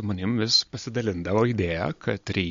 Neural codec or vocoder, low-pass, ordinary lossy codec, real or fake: none; 7.2 kHz; MP3, 48 kbps; real